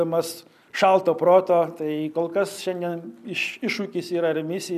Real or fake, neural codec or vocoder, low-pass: real; none; 14.4 kHz